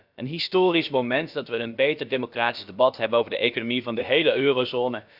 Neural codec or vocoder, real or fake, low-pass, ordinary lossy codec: codec, 16 kHz, about 1 kbps, DyCAST, with the encoder's durations; fake; 5.4 kHz; none